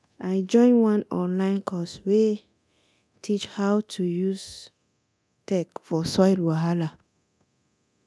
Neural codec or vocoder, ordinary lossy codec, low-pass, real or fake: codec, 24 kHz, 0.9 kbps, DualCodec; none; none; fake